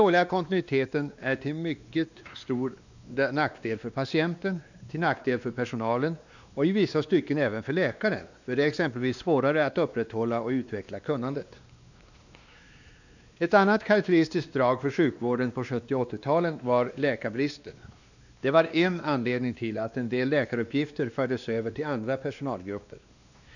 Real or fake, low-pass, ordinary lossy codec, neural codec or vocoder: fake; 7.2 kHz; none; codec, 16 kHz, 2 kbps, X-Codec, WavLM features, trained on Multilingual LibriSpeech